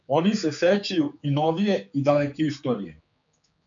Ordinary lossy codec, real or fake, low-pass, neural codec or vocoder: MP3, 64 kbps; fake; 7.2 kHz; codec, 16 kHz, 4 kbps, X-Codec, HuBERT features, trained on general audio